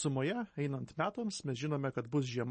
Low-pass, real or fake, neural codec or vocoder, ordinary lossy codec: 10.8 kHz; real; none; MP3, 32 kbps